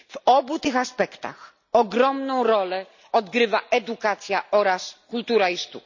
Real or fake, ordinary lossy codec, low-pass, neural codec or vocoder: real; none; 7.2 kHz; none